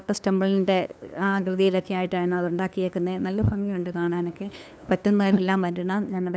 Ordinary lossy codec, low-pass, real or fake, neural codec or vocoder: none; none; fake; codec, 16 kHz, 2 kbps, FunCodec, trained on LibriTTS, 25 frames a second